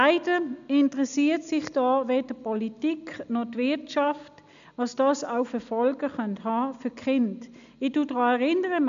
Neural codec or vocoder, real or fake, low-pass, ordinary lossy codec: none; real; 7.2 kHz; MP3, 96 kbps